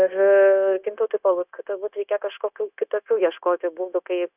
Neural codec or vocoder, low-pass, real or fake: codec, 16 kHz, 0.9 kbps, LongCat-Audio-Codec; 3.6 kHz; fake